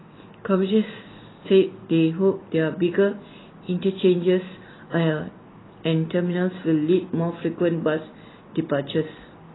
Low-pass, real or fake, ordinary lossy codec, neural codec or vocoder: 7.2 kHz; real; AAC, 16 kbps; none